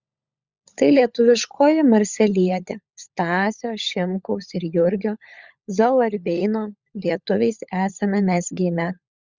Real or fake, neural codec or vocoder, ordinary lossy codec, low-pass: fake; codec, 16 kHz, 16 kbps, FunCodec, trained on LibriTTS, 50 frames a second; Opus, 64 kbps; 7.2 kHz